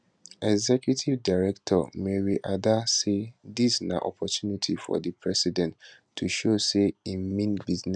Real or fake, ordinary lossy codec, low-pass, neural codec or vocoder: real; none; 9.9 kHz; none